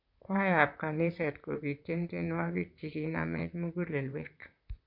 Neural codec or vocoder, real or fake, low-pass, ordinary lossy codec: vocoder, 44.1 kHz, 128 mel bands, Pupu-Vocoder; fake; 5.4 kHz; none